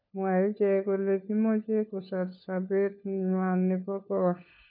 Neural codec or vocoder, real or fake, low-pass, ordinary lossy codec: codec, 16 kHz, 4 kbps, FunCodec, trained on LibriTTS, 50 frames a second; fake; 5.4 kHz; none